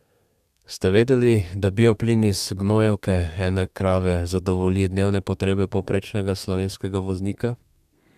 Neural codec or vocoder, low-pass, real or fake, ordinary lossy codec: codec, 32 kHz, 1.9 kbps, SNAC; 14.4 kHz; fake; none